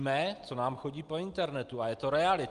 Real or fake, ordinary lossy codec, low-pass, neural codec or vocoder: real; Opus, 32 kbps; 10.8 kHz; none